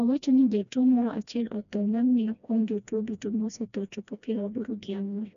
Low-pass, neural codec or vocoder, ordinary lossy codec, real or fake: 7.2 kHz; codec, 16 kHz, 1 kbps, FreqCodec, smaller model; MP3, 96 kbps; fake